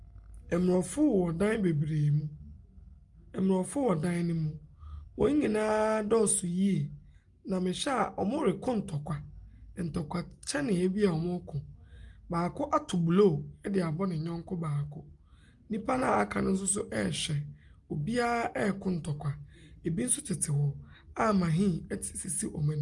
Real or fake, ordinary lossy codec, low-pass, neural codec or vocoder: real; Opus, 32 kbps; 10.8 kHz; none